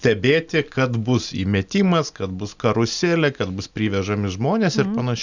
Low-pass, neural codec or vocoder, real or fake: 7.2 kHz; none; real